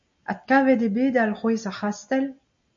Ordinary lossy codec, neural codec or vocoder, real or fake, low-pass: AAC, 64 kbps; none; real; 7.2 kHz